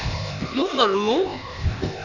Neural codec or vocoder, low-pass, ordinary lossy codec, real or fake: codec, 16 kHz, 0.8 kbps, ZipCodec; 7.2 kHz; none; fake